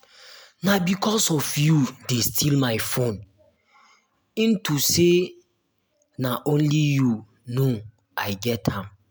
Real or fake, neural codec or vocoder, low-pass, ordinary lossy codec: real; none; none; none